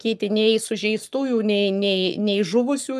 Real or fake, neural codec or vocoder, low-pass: fake; codec, 44.1 kHz, 7.8 kbps, Pupu-Codec; 14.4 kHz